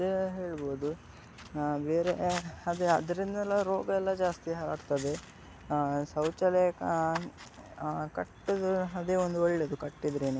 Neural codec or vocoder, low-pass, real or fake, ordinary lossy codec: none; none; real; none